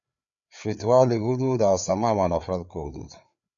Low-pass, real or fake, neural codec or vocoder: 7.2 kHz; fake; codec, 16 kHz, 4 kbps, FreqCodec, larger model